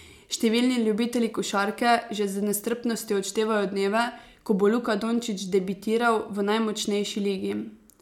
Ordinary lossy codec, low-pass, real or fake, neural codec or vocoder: MP3, 96 kbps; 19.8 kHz; real; none